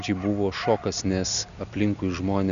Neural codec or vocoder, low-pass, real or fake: none; 7.2 kHz; real